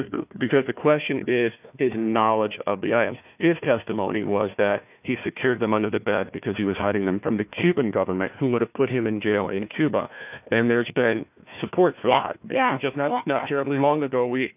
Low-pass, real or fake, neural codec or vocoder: 3.6 kHz; fake; codec, 16 kHz, 1 kbps, FunCodec, trained on Chinese and English, 50 frames a second